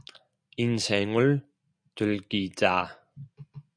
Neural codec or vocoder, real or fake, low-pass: none; real; 9.9 kHz